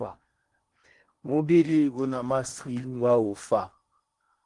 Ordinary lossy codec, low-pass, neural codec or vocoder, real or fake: Opus, 24 kbps; 10.8 kHz; codec, 16 kHz in and 24 kHz out, 0.8 kbps, FocalCodec, streaming, 65536 codes; fake